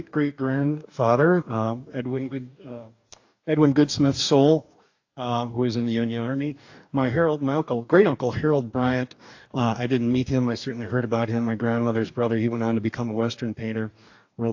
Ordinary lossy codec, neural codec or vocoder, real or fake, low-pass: AAC, 48 kbps; codec, 44.1 kHz, 2.6 kbps, DAC; fake; 7.2 kHz